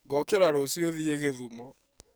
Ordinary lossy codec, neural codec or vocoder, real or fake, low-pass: none; codec, 44.1 kHz, 2.6 kbps, SNAC; fake; none